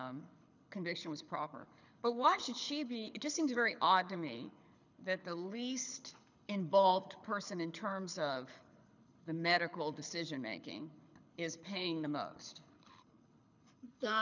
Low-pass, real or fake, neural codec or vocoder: 7.2 kHz; fake; codec, 24 kHz, 6 kbps, HILCodec